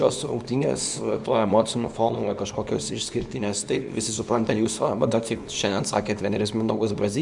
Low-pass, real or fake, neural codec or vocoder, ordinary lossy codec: 10.8 kHz; fake; codec, 24 kHz, 0.9 kbps, WavTokenizer, small release; Opus, 64 kbps